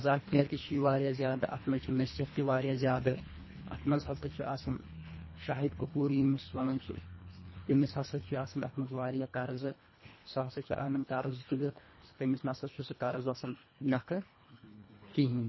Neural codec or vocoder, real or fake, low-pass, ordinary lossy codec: codec, 24 kHz, 1.5 kbps, HILCodec; fake; 7.2 kHz; MP3, 24 kbps